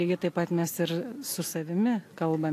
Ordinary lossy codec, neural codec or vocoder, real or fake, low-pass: AAC, 64 kbps; none; real; 14.4 kHz